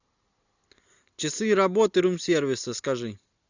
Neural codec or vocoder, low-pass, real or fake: none; 7.2 kHz; real